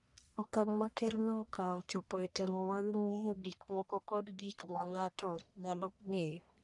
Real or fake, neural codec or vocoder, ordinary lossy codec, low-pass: fake; codec, 44.1 kHz, 1.7 kbps, Pupu-Codec; none; 10.8 kHz